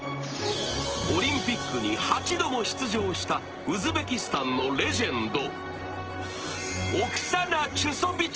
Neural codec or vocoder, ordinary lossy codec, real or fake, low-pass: none; Opus, 16 kbps; real; 7.2 kHz